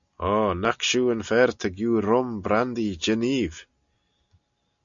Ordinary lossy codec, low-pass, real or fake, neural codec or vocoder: MP3, 64 kbps; 7.2 kHz; real; none